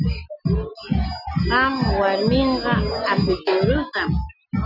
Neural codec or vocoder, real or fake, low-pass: none; real; 5.4 kHz